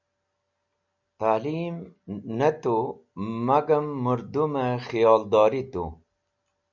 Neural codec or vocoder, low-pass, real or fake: none; 7.2 kHz; real